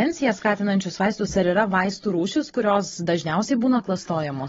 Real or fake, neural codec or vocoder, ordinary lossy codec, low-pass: fake; codec, 16 kHz, 16 kbps, FunCodec, trained on Chinese and English, 50 frames a second; AAC, 24 kbps; 7.2 kHz